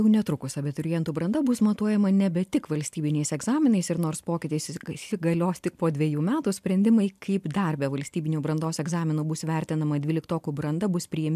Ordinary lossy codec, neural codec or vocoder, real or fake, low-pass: MP3, 96 kbps; none; real; 14.4 kHz